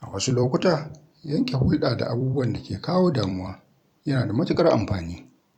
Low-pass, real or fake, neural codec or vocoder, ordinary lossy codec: 19.8 kHz; fake; vocoder, 44.1 kHz, 128 mel bands every 256 samples, BigVGAN v2; none